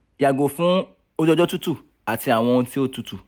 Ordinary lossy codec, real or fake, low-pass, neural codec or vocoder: none; real; none; none